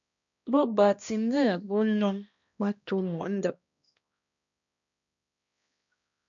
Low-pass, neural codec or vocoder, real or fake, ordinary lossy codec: 7.2 kHz; codec, 16 kHz, 1 kbps, X-Codec, HuBERT features, trained on balanced general audio; fake; MP3, 96 kbps